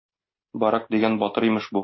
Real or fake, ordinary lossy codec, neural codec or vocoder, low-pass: real; MP3, 24 kbps; none; 7.2 kHz